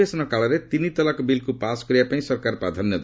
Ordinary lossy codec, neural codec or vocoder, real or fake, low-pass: none; none; real; none